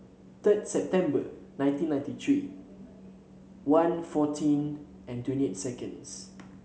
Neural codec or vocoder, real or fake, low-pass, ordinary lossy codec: none; real; none; none